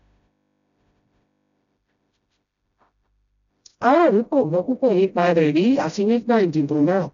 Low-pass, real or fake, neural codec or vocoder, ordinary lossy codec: 7.2 kHz; fake; codec, 16 kHz, 0.5 kbps, FreqCodec, smaller model; none